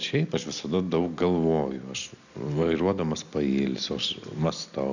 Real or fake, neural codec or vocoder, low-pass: real; none; 7.2 kHz